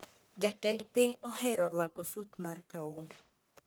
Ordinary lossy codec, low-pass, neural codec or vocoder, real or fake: none; none; codec, 44.1 kHz, 1.7 kbps, Pupu-Codec; fake